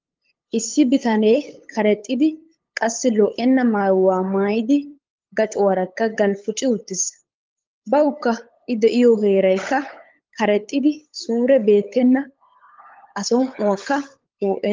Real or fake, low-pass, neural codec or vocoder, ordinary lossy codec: fake; 7.2 kHz; codec, 16 kHz, 8 kbps, FunCodec, trained on LibriTTS, 25 frames a second; Opus, 32 kbps